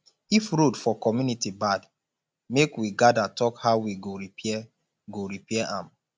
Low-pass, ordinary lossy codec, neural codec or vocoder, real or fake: none; none; none; real